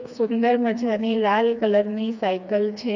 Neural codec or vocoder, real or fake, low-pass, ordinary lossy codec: codec, 16 kHz, 2 kbps, FreqCodec, smaller model; fake; 7.2 kHz; none